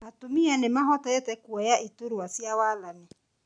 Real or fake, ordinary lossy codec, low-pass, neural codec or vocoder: real; none; 9.9 kHz; none